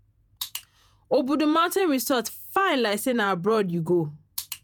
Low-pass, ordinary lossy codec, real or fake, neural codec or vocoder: none; none; fake; vocoder, 48 kHz, 128 mel bands, Vocos